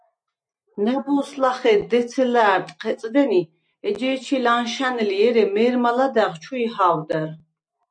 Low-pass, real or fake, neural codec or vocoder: 9.9 kHz; real; none